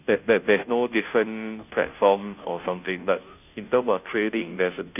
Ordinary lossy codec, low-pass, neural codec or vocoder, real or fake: none; 3.6 kHz; codec, 16 kHz, 0.5 kbps, FunCodec, trained on Chinese and English, 25 frames a second; fake